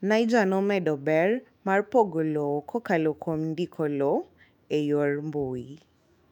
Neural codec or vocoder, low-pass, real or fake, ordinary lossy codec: autoencoder, 48 kHz, 128 numbers a frame, DAC-VAE, trained on Japanese speech; 19.8 kHz; fake; none